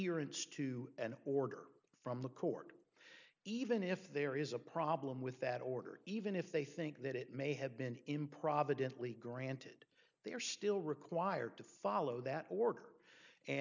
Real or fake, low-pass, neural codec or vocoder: real; 7.2 kHz; none